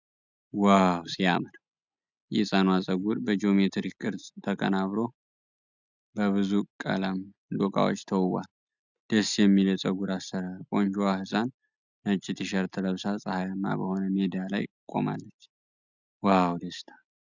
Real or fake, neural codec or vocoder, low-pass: real; none; 7.2 kHz